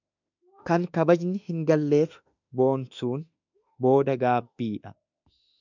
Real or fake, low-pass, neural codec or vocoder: fake; 7.2 kHz; autoencoder, 48 kHz, 32 numbers a frame, DAC-VAE, trained on Japanese speech